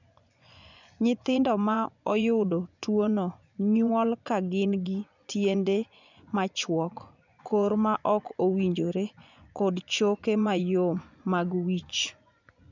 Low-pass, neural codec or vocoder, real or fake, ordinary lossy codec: 7.2 kHz; vocoder, 24 kHz, 100 mel bands, Vocos; fake; none